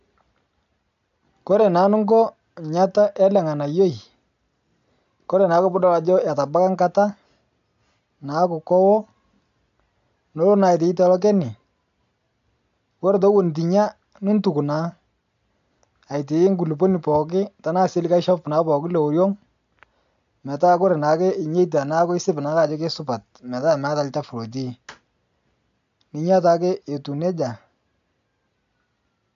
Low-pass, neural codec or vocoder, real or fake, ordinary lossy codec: 7.2 kHz; none; real; AAC, 64 kbps